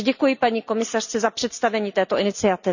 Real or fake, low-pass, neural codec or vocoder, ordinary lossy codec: real; 7.2 kHz; none; none